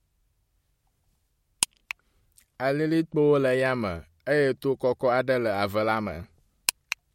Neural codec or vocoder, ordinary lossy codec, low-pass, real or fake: none; MP3, 64 kbps; 19.8 kHz; real